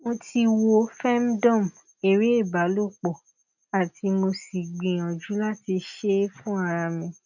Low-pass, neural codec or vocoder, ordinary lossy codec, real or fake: 7.2 kHz; none; none; real